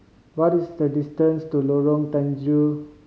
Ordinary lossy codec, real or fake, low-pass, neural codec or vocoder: none; real; none; none